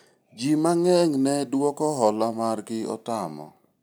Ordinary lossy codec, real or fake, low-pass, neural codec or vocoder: none; real; none; none